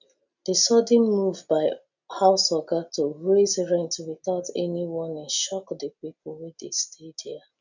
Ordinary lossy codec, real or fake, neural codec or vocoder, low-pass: none; real; none; 7.2 kHz